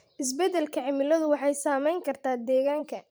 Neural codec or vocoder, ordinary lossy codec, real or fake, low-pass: vocoder, 44.1 kHz, 128 mel bands every 512 samples, BigVGAN v2; none; fake; none